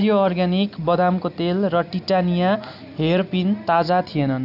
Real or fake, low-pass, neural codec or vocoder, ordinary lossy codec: real; 5.4 kHz; none; none